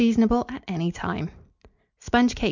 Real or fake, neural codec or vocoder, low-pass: real; none; 7.2 kHz